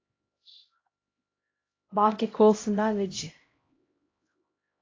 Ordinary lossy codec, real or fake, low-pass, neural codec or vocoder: AAC, 32 kbps; fake; 7.2 kHz; codec, 16 kHz, 0.5 kbps, X-Codec, HuBERT features, trained on LibriSpeech